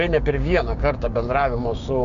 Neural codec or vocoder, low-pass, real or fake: codec, 16 kHz, 6 kbps, DAC; 7.2 kHz; fake